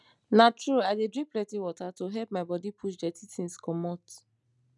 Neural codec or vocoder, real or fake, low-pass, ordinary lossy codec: none; real; 10.8 kHz; none